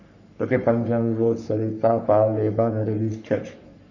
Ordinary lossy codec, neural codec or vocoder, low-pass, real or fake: none; codec, 44.1 kHz, 3.4 kbps, Pupu-Codec; 7.2 kHz; fake